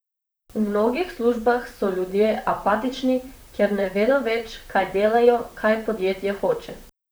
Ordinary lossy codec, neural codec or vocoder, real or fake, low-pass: none; vocoder, 44.1 kHz, 128 mel bands, Pupu-Vocoder; fake; none